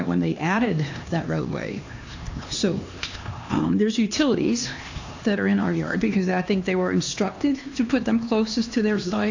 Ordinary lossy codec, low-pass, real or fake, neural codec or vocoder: AAC, 48 kbps; 7.2 kHz; fake; codec, 16 kHz, 2 kbps, X-Codec, HuBERT features, trained on LibriSpeech